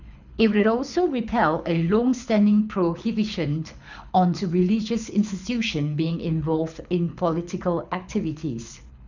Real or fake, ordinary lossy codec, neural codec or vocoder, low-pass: fake; none; codec, 24 kHz, 6 kbps, HILCodec; 7.2 kHz